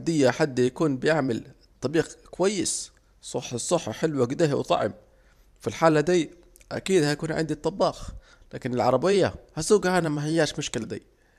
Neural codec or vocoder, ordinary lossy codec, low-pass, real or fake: vocoder, 44.1 kHz, 128 mel bands every 512 samples, BigVGAN v2; none; 14.4 kHz; fake